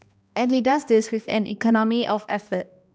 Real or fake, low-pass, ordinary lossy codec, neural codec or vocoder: fake; none; none; codec, 16 kHz, 1 kbps, X-Codec, HuBERT features, trained on balanced general audio